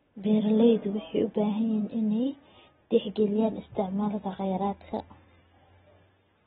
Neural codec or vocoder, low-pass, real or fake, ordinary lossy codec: none; 7.2 kHz; real; AAC, 16 kbps